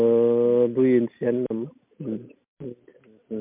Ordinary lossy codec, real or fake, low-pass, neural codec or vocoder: MP3, 32 kbps; real; 3.6 kHz; none